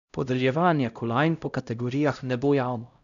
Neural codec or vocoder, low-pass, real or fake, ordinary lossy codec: codec, 16 kHz, 0.5 kbps, X-Codec, HuBERT features, trained on LibriSpeech; 7.2 kHz; fake; none